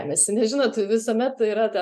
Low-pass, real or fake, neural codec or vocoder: 14.4 kHz; real; none